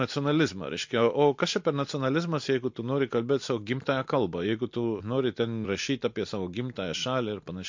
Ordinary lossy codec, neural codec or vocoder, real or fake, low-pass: MP3, 48 kbps; none; real; 7.2 kHz